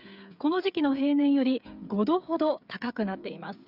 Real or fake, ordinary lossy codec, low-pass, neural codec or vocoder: fake; none; 5.4 kHz; codec, 16 kHz, 8 kbps, FreqCodec, smaller model